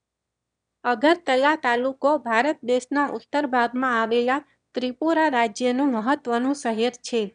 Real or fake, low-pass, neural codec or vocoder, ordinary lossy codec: fake; 9.9 kHz; autoencoder, 22.05 kHz, a latent of 192 numbers a frame, VITS, trained on one speaker; none